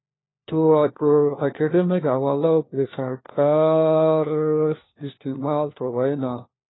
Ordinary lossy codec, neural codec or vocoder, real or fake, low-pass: AAC, 16 kbps; codec, 16 kHz, 1 kbps, FunCodec, trained on LibriTTS, 50 frames a second; fake; 7.2 kHz